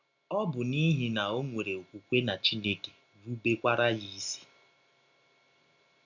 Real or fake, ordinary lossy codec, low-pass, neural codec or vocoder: real; none; 7.2 kHz; none